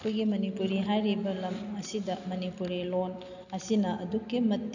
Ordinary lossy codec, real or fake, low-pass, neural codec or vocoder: none; real; 7.2 kHz; none